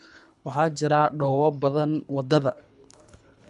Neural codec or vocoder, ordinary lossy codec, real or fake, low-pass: codec, 24 kHz, 3 kbps, HILCodec; AAC, 96 kbps; fake; 10.8 kHz